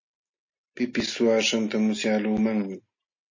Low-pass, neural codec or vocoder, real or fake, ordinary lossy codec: 7.2 kHz; none; real; MP3, 32 kbps